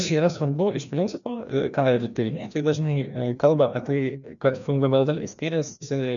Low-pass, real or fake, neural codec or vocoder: 7.2 kHz; fake; codec, 16 kHz, 1 kbps, FreqCodec, larger model